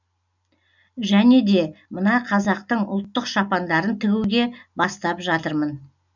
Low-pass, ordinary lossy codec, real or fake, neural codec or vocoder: 7.2 kHz; none; real; none